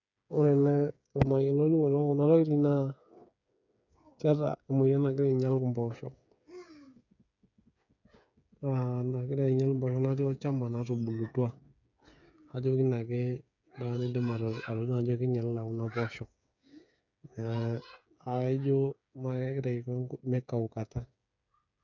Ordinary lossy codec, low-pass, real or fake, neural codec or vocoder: none; 7.2 kHz; fake; codec, 16 kHz, 8 kbps, FreqCodec, smaller model